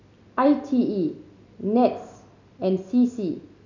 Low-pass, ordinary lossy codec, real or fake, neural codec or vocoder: 7.2 kHz; none; real; none